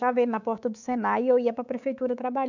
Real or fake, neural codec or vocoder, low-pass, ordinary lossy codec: fake; codec, 24 kHz, 3.1 kbps, DualCodec; 7.2 kHz; none